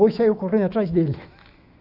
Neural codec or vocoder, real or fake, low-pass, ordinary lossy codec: autoencoder, 48 kHz, 128 numbers a frame, DAC-VAE, trained on Japanese speech; fake; 5.4 kHz; Opus, 64 kbps